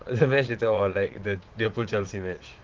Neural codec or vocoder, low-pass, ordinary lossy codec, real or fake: vocoder, 22.05 kHz, 80 mel bands, Vocos; 7.2 kHz; Opus, 16 kbps; fake